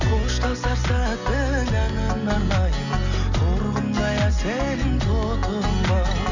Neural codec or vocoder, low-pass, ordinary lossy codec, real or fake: none; 7.2 kHz; none; real